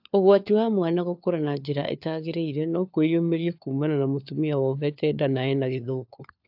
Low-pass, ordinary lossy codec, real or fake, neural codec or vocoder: 5.4 kHz; AAC, 48 kbps; fake; codec, 16 kHz, 4 kbps, FunCodec, trained on LibriTTS, 50 frames a second